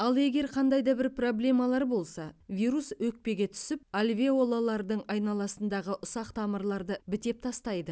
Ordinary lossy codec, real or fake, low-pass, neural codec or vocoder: none; real; none; none